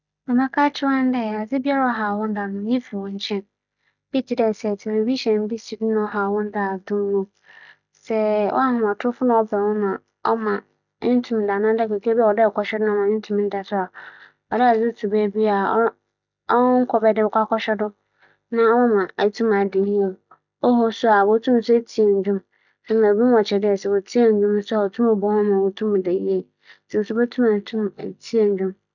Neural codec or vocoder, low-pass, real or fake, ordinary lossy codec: none; 7.2 kHz; real; none